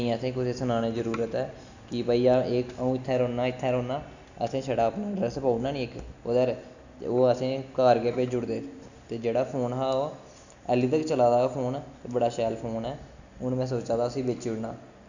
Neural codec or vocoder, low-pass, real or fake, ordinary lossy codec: none; 7.2 kHz; real; none